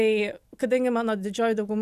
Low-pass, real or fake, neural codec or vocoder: 14.4 kHz; real; none